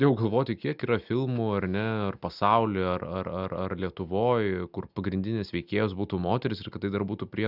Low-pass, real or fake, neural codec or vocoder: 5.4 kHz; real; none